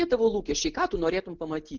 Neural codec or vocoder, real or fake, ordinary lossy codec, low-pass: none; real; Opus, 16 kbps; 7.2 kHz